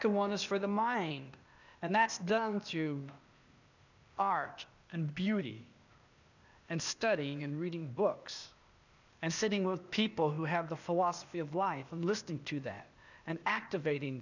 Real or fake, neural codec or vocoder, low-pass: fake; codec, 16 kHz, 0.8 kbps, ZipCodec; 7.2 kHz